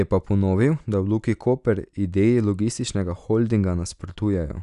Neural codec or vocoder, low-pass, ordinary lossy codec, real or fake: none; 10.8 kHz; none; real